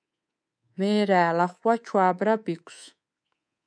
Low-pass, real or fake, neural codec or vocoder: 9.9 kHz; fake; codec, 24 kHz, 3.1 kbps, DualCodec